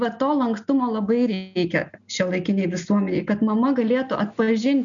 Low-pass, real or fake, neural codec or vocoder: 7.2 kHz; real; none